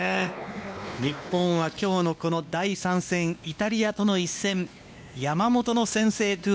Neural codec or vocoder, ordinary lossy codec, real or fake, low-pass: codec, 16 kHz, 2 kbps, X-Codec, WavLM features, trained on Multilingual LibriSpeech; none; fake; none